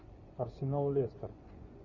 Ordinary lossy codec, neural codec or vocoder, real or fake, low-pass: Opus, 64 kbps; vocoder, 24 kHz, 100 mel bands, Vocos; fake; 7.2 kHz